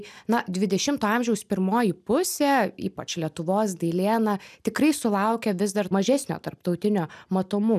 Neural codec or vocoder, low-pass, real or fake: none; 14.4 kHz; real